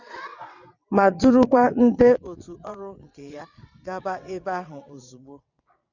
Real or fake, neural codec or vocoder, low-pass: fake; vocoder, 22.05 kHz, 80 mel bands, WaveNeXt; 7.2 kHz